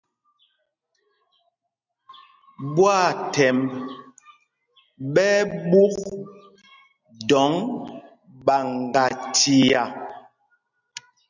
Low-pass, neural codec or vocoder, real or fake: 7.2 kHz; none; real